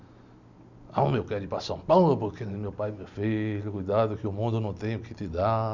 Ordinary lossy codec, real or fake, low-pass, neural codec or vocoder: none; real; 7.2 kHz; none